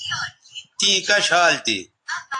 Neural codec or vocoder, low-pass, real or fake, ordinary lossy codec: none; 10.8 kHz; real; AAC, 48 kbps